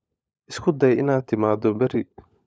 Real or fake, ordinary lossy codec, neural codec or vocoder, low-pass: fake; none; codec, 16 kHz, 16 kbps, FunCodec, trained on LibriTTS, 50 frames a second; none